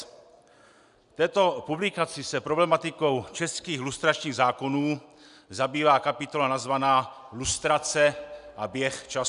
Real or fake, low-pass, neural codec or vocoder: real; 10.8 kHz; none